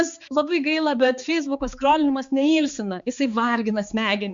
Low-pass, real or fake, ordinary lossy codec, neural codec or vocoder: 7.2 kHz; fake; Opus, 64 kbps; codec, 16 kHz, 4 kbps, X-Codec, HuBERT features, trained on balanced general audio